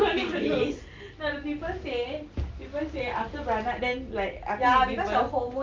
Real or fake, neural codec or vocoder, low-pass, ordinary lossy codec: real; none; 7.2 kHz; Opus, 24 kbps